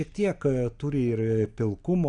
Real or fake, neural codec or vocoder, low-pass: real; none; 9.9 kHz